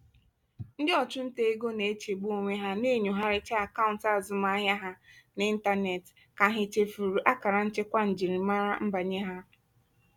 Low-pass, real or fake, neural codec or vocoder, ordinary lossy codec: 19.8 kHz; real; none; none